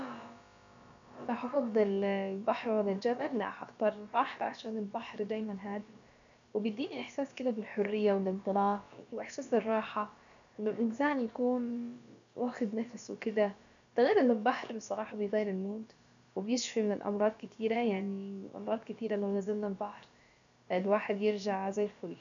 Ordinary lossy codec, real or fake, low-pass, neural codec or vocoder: none; fake; 7.2 kHz; codec, 16 kHz, about 1 kbps, DyCAST, with the encoder's durations